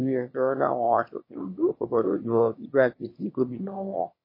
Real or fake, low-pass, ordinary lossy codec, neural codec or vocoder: fake; 5.4 kHz; MP3, 24 kbps; autoencoder, 22.05 kHz, a latent of 192 numbers a frame, VITS, trained on one speaker